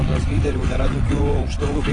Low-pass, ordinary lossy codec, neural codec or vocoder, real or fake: 9.9 kHz; AAC, 32 kbps; vocoder, 22.05 kHz, 80 mel bands, WaveNeXt; fake